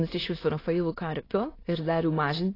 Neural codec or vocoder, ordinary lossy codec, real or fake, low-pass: autoencoder, 22.05 kHz, a latent of 192 numbers a frame, VITS, trained on many speakers; AAC, 24 kbps; fake; 5.4 kHz